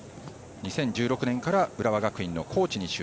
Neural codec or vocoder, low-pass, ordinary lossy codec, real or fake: none; none; none; real